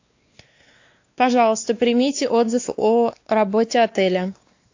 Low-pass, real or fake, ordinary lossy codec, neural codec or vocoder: 7.2 kHz; fake; AAC, 48 kbps; codec, 16 kHz, 2 kbps, X-Codec, WavLM features, trained on Multilingual LibriSpeech